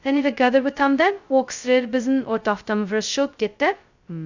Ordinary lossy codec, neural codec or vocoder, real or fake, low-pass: Opus, 64 kbps; codec, 16 kHz, 0.2 kbps, FocalCodec; fake; 7.2 kHz